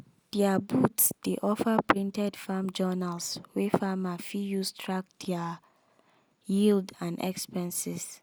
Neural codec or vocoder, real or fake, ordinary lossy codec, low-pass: none; real; none; none